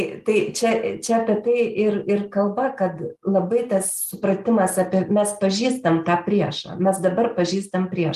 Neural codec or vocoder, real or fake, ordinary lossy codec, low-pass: none; real; Opus, 24 kbps; 14.4 kHz